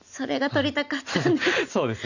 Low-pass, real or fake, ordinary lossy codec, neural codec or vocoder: 7.2 kHz; real; none; none